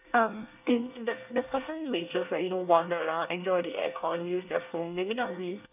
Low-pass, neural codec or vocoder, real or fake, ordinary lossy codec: 3.6 kHz; codec, 24 kHz, 1 kbps, SNAC; fake; none